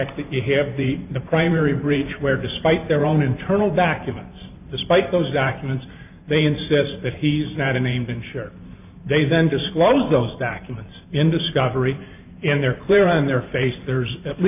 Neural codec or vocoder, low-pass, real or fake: vocoder, 44.1 kHz, 128 mel bands every 512 samples, BigVGAN v2; 3.6 kHz; fake